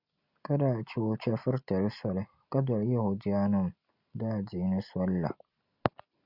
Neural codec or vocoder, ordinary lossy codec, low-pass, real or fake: none; Opus, 64 kbps; 5.4 kHz; real